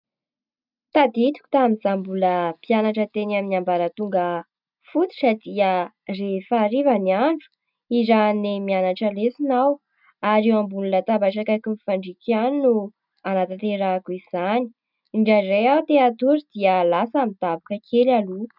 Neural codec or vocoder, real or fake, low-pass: none; real; 5.4 kHz